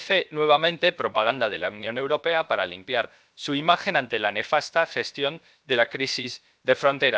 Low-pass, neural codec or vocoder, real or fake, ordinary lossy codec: none; codec, 16 kHz, 0.7 kbps, FocalCodec; fake; none